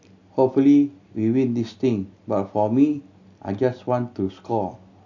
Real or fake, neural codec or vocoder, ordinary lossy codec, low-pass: real; none; AAC, 48 kbps; 7.2 kHz